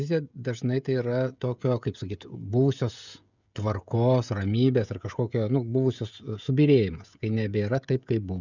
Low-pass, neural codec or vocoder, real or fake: 7.2 kHz; codec, 16 kHz, 16 kbps, FreqCodec, smaller model; fake